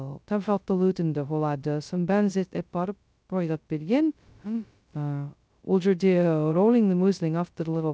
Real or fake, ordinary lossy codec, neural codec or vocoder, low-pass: fake; none; codec, 16 kHz, 0.2 kbps, FocalCodec; none